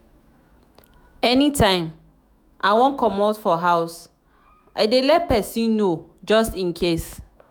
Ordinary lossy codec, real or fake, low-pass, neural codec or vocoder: none; fake; none; autoencoder, 48 kHz, 128 numbers a frame, DAC-VAE, trained on Japanese speech